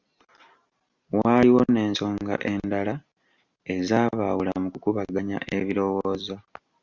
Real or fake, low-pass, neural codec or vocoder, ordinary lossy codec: real; 7.2 kHz; none; Opus, 64 kbps